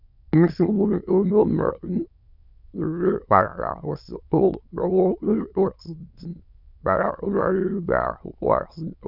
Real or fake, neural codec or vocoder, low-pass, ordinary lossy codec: fake; autoencoder, 22.05 kHz, a latent of 192 numbers a frame, VITS, trained on many speakers; 5.4 kHz; none